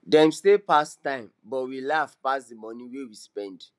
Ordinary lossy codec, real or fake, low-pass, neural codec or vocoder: none; real; none; none